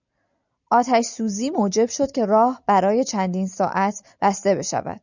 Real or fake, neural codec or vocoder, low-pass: real; none; 7.2 kHz